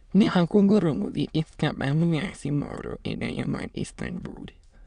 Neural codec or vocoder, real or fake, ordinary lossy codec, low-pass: autoencoder, 22.05 kHz, a latent of 192 numbers a frame, VITS, trained on many speakers; fake; Opus, 64 kbps; 9.9 kHz